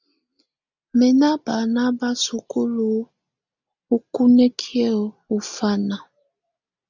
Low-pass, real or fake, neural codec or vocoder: 7.2 kHz; real; none